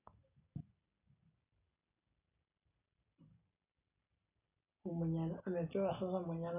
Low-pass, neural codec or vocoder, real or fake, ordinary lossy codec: 3.6 kHz; none; real; none